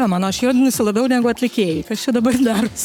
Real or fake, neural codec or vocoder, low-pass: fake; codec, 44.1 kHz, 7.8 kbps, Pupu-Codec; 19.8 kHz